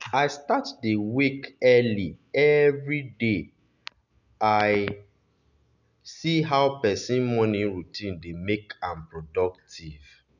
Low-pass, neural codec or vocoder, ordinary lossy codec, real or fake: 7.2 kHz; none; none; real